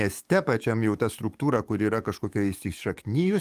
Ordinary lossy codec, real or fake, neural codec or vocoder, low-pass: Opus, 32 kbps; fake; codec, 44.1 kHz, 7.8 kbps, DAC; 14.4 kHz